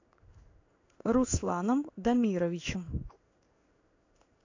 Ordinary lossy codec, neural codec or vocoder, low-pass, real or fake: AAC, 48 kbps; codec, 16 kHz in and 24 kHz out, 1 kbps, XY-Tokenizer; 7.2 kHz; fake